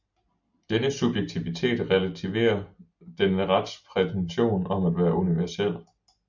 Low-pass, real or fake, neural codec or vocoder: 7.2 kHz; real; none